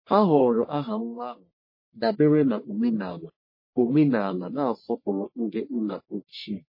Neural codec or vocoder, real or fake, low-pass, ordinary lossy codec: codec, 44.1 kHz, 1.7 kbps, Pupu-Codec; fake; 5.4 kHz; MP3, 24 kbps